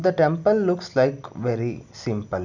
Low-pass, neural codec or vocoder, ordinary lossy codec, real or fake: 7.2 kHz; none; none; real